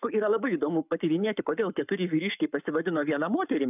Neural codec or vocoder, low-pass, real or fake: codec, 44.1 kHz, 7.8 kbps, Pupu-Codec; 3.6 kHz; fake